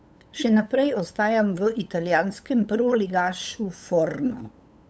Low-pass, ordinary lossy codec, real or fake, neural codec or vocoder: none; none; fake; codec, 16 kHz, 8 kbps, FunCodec, trained on LibriTTS, 25 frames a second